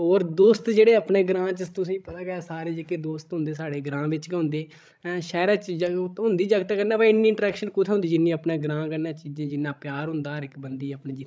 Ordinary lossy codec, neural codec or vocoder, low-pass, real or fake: none; codec, 16 kHz, 16 kbps, FreqCodec, larger model; none; fake